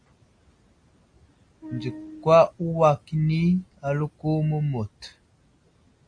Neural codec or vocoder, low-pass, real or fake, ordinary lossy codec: none; 9.9 kHz; real; AAC, 48 kbps